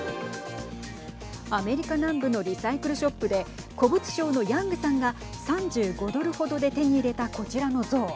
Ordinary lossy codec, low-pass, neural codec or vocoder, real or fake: none; none; none; real